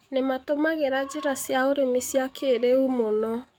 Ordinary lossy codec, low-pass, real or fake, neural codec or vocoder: MP3, 96 kbps; 19.8 kHz; fake; codec, 44.1 kHz, 7.8 kbps, DAC